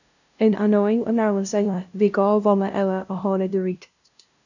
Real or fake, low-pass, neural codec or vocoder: fake; 7.2 kHz; codec, 16 kHz, 0.5 kbps, FunCodec, trained on LibriTTS, 25 frames a second